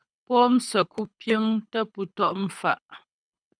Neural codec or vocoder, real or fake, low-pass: codec, 24 kHz, 6 kbps, HILCodec; fake; 9.9 kHz